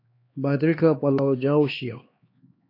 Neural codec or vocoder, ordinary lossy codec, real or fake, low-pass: codec, 16 kHz, 2 kbps, X-Codec, HuBERT features, trained on LibriSpeech; AAC, 32 kbps; fake; 5.4 kHz